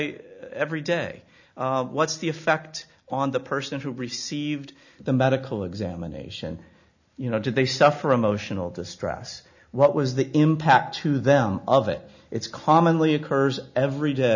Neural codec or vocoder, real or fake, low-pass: none; real; 7.2 kHz